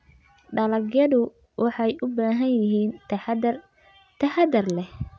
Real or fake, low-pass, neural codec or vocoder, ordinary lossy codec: real; none; none; none